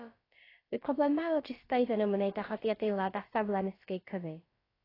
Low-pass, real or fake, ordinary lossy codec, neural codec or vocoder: 5.4 kHz; fake; AAC, 24 kbps; codec, 16 kHz, about 1 kbps, DyCAST, with the encoder's durations